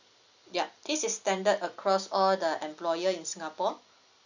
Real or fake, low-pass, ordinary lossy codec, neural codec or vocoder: real; 7.2 kHz; none; none